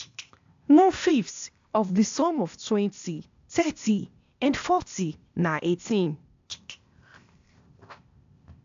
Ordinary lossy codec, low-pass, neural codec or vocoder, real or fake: AAC, 64 kbps; 7.2 kHz; codec, 16 kHz, 0.8 kbps, ZipCodec; fake